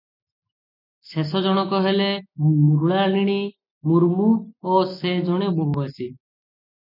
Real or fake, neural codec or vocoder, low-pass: real; none; 5.4 kHz